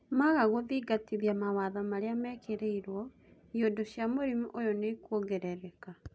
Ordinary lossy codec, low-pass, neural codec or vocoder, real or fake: none; none; none; real